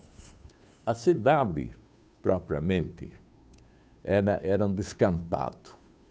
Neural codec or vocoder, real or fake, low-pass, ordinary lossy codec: codec, 16 kHz, 2 kbps, FunCodec, trained on Chinese and English, 25 frames a second; fake; none; none